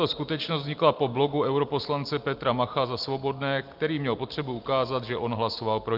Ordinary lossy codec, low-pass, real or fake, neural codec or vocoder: Opus, 32 kbps; 5.4 kHz; real; none